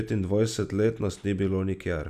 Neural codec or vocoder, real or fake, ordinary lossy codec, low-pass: none; real; none; 14.4 kHz